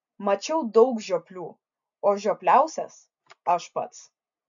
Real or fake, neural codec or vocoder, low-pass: real; none; 7.2 kHz